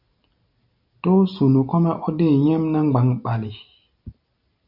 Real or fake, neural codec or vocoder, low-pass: real; none; 5.4 kHz